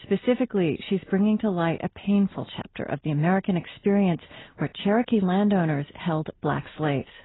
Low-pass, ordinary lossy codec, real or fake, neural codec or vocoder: 7.2 kHz; AAC, 16 kbps; real; none